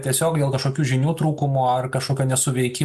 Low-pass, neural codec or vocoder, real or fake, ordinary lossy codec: 14.4 kHz; none; real; MP3, 96 kbps